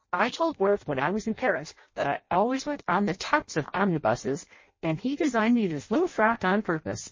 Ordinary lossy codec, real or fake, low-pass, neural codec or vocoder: MP3, 32 kbps; fake; 7.2 kHz; codec, 16 kHz in and 24 kHz out, 0.6 kbps, FireRedTTS-2 codec